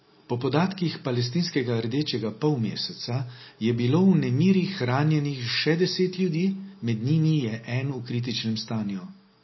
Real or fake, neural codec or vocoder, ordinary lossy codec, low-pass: real; none; MP3, 24 kbps; 7.2 kHz